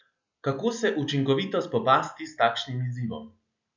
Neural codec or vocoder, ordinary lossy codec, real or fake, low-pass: none; none; real; 7.2 kHz